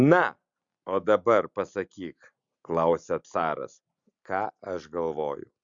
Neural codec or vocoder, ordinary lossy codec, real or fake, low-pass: none; Opus, 64 kbps; real; 7.2 kHz